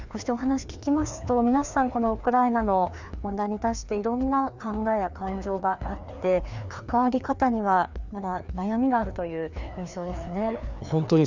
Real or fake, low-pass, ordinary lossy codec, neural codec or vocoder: fake; 7.2 kHz; none; codec, 16 kHz, 2 kbps, FreqCodec, larger model